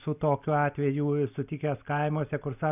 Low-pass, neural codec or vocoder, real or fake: 3.6 kHz; none; real